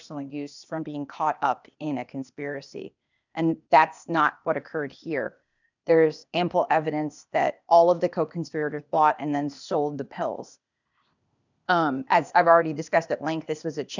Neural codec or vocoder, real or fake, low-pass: codec, 16 kHz, 0.8 kbps, ZipCodec; fake; 7.2 kHz